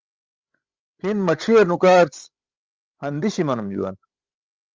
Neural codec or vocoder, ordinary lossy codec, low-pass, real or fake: codec, 16 kHz, 16 kbps, FreqCodec, larger model; Opus, 32 kbps; 7.2 kHz; fake